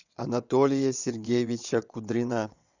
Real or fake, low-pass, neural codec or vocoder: fake; 7.2 kHz; vocoder, 22.05 kHz, 80 mel bands, WaveNeXt